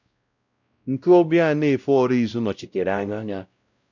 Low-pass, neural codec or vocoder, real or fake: 7.2 kHz; codec, 16 kHz, 0.5 kbps, X-Codec, WavLM features, trained on Multilingual LibriSpeech; fake